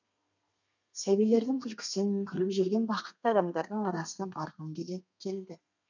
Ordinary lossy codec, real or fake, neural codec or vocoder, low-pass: none; fake; codec, 32 kHz, 1.9 kbps, SNAC; 7.2 kHz